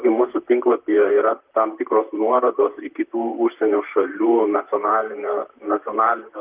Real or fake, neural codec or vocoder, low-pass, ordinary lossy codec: fake; vocoder, 44.1 kHz, 128 mel bands, Pupu-Vocoder; 3.6 kHz; Opus, 32 kbps